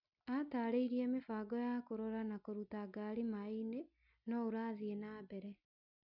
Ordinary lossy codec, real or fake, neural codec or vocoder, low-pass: none; real; none; 5.4 kHz